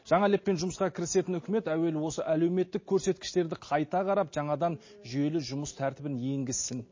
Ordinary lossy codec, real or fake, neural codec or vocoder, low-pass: MP3, 32 kbps; real; none; 7.2 kHz